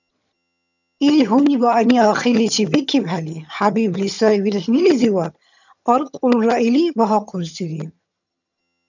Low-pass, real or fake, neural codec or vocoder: 7.2 kHz; fake; vocoder, 22.05 kHz, 80 mel bands, HiFi-GAN